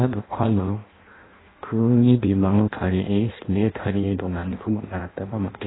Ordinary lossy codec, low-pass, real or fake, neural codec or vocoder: AAC, 16 kbps; 7.2 kHz; fake; codec, 16 kHz in and 24 kHz out, 0.6 kbps, FireRedTTS-2 codec